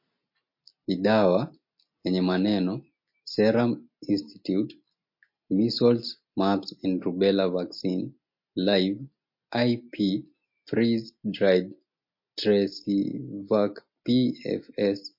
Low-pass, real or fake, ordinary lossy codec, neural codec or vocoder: 5.4 kHz; real; MP3, 32 kbps; none